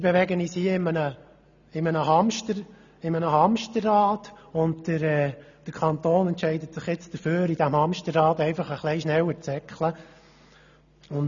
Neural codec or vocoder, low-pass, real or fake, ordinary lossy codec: none; 7.2 kHz; real; none